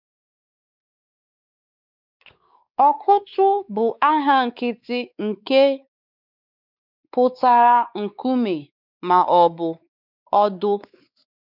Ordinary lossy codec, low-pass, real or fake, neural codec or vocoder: none; 5.4 kHz; fake; codec, 16 kHz, 4 kbps, X-Codec, WavLM features, trained on Multilingual LibriSpeech